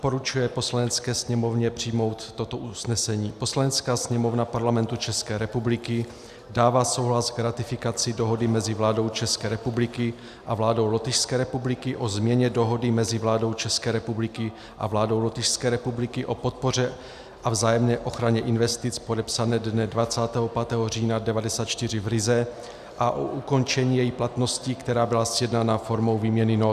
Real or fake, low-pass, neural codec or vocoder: real; 14.4 kHz; none